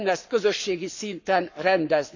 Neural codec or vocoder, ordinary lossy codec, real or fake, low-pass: codec, 44.1 kHz, 7.8 kbps, Pupu-Codec; none; fake; 7.2 kHz